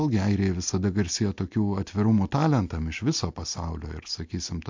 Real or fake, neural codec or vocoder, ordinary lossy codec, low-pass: real; none; MP3, 48 kbps; 7.2 kHz